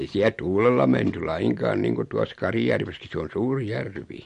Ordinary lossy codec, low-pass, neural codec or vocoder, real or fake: MP3, 48 kbps; 14.4 kHz; none; real